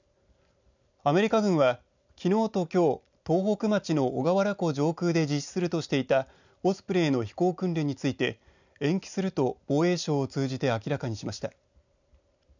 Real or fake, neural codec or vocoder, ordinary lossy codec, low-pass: real; none; none; 7.2 kHz